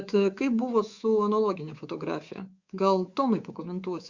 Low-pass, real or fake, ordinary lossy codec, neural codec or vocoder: 7.2 kHz; fake; Opus, 64 kbps; codec, 24 kHz, 3.1 kbps, DualCodec